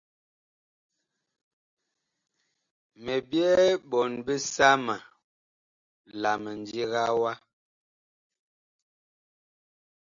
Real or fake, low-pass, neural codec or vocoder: real; 7.2 kHz; none